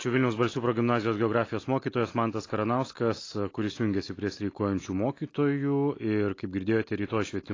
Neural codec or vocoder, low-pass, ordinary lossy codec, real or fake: none; 7.2 kHz; AAC, 32 kbps; real